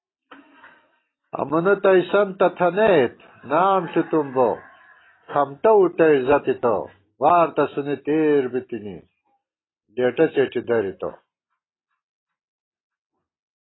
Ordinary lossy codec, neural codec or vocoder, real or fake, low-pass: AAC, 16 kbps; none; real; 7.2 kHz